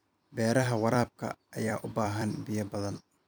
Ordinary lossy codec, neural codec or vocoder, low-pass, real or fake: none; vocoder, 44.1 kHz, 128 mel bands every 256 samples, BigVGAN v2; none; fake